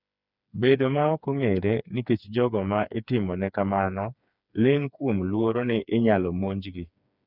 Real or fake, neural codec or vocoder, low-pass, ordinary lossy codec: fake; codec, 16 kHz, 4 kbps, FreqCodec, smaller model; 5.4 kHz; none